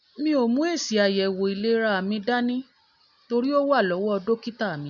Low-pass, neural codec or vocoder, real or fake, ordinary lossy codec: 7.2 kHz; none; real; none